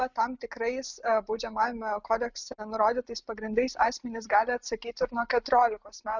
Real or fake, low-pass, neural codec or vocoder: real; 7.2 kHz; none